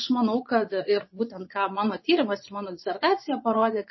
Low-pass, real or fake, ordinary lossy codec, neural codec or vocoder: 7.2 kHz; fake; MP3, 24 kbps; vocoder, 24 kHz, 100 mel bands, Vocos